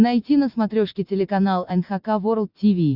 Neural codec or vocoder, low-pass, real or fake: none; 5.4 kHz; real